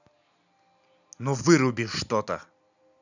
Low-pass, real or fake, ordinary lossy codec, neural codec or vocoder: 7.2 kHz; real; none; none